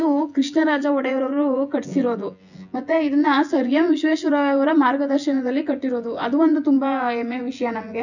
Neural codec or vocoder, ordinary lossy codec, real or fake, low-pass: vocoder, 24 kHz, 100 mel bands, Vocos; none; fake; 7.2 kHz